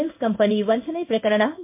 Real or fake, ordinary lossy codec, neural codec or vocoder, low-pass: fake; MP3, 32 kbps; codec, 16 kHz in and 24 kHz out, 1 kbps, XY-Tokenizer; 3.6 kHz